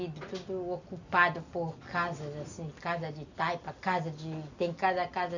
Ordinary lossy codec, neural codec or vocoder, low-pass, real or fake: none; none; 7.2 kHz; real